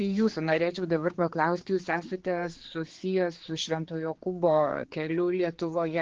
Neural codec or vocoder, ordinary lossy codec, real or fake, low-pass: codec, 16 kHz, 4 kbps, X-Codec, HuBERT features, trained on general audio; Opus, 16 kbps; fake; 7.2 kHz